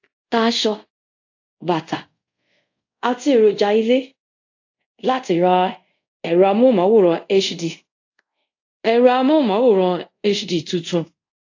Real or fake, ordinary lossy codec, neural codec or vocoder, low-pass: fake; AAC, 48 kbps; codec, 24 kHz, 0.5 kbps, DualCodec; 7.2 kHz